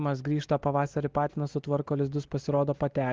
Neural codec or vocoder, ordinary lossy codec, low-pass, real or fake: none; Opus, 16 kbps; 7.2 kHz; real